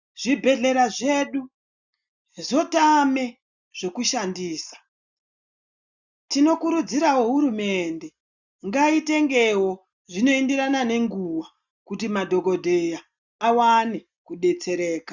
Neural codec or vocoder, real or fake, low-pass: none; real; 7.2 kHz